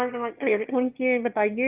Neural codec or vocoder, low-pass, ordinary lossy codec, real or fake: autoencoder, 22.05 kHz, a latent of 192 numbers a frame, VITS, trained on one speaker; 3.6 kHz; Opus, 24 kbps; fake